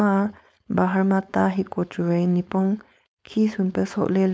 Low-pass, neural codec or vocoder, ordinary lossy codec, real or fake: none; codec, 16 kHz, 4.8 kbps, FACodec; none; fake